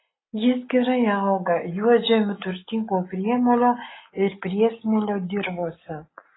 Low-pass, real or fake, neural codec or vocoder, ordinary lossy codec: 7.2 kHz; real; none; AAC, 16 kbps